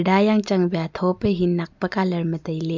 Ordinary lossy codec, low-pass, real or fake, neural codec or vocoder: MP3, 64 kbps; 7.2 kHz; real; none